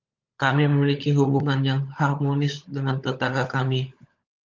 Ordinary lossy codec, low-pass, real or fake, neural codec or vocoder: Opus, 24 kbps; 7.2 kHz; fake; codec, 16 kHz, 16 kbps, FunCodec, trained on LibriTTS, 50 frames a second